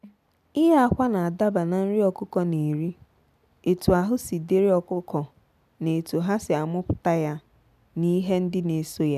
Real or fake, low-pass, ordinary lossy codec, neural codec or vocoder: real; 14.4 kHz; none; none